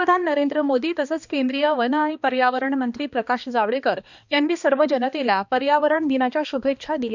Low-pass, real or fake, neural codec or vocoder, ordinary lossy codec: 7.2 kHz; fake; codec, 16 kHz, 2 kbps, X-Codec, HuBERT features, trained on balanced general audio; none